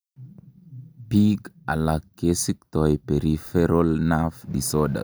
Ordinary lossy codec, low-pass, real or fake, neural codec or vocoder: none; none; real; none